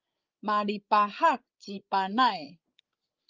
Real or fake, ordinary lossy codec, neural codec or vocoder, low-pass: fake; Opus, 24 kbps; codec, 16 kHz, 16 kbps, FreqCodec, larger model; 7.2 kHz